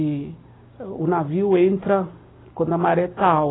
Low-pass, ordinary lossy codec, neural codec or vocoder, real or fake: 7.2 kHz; AAC, 16 kbps; none; real